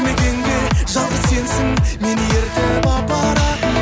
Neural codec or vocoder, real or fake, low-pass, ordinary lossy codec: none; real; none; none